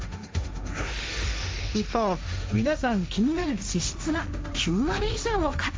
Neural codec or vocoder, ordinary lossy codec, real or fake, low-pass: codec, 16 kHz, 1.1 kbps, Voila-Tokenizer; none; fake; none